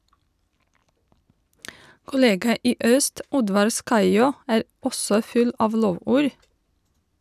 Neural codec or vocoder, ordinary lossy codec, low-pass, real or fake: none; none; 14.4 kHz; real